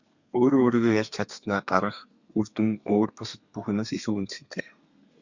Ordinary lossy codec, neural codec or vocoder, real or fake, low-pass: Opus, 64 kbps; codec, 32 kHz, 1.9 kbps, SNAC; fake; 7.2 kHz